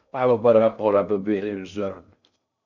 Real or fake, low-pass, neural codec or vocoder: fake; 7.2 kHz; codec, 16 kHz in and 24 kHz out, 0.6 kbps, FocalCodec, streaming, 2048 codes